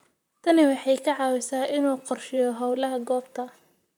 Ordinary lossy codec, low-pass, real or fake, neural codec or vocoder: none; none; fake; vocoder, 44.1 kHz, 128 mel bands, Pupu-Vocoder